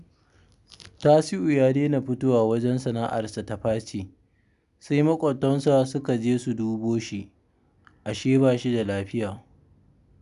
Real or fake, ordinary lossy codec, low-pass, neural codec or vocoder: real; none; 10.8 kHz; none